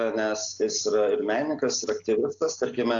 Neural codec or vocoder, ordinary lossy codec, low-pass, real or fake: none; AAC, 64 kbps; 7.2 kHz; real